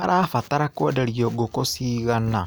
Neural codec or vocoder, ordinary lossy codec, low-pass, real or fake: none; none; none; real